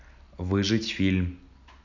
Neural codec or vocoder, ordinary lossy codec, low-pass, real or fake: none; none; 7.2 kHz; real